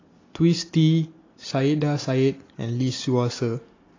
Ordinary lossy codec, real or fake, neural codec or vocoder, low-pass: AAC, 32 kbps; real; none; 7.2 kHz